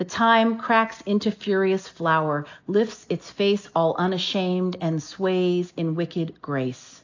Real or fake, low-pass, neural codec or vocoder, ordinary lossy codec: real; 7.2 kHz; none; AAC, 48 kbps